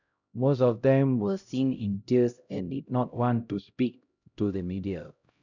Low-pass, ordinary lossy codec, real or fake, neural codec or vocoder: 7.2 kHz; none; fake; codec, 16 kHz, 0.5 kbps, X-Codec, HuBERT features, trained on LibriSpeech